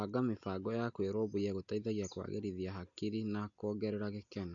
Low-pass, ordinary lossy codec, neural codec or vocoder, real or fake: 7.2 kHz; AAC, 64 kbps; none; real